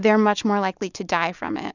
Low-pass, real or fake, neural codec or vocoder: 7.2 kHz; real; none